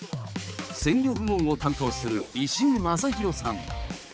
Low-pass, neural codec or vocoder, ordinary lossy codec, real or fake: none; codec, 16 kHz, 4 kbps, X-Codec, HuBERT features, trained on balanced general audio; none; fake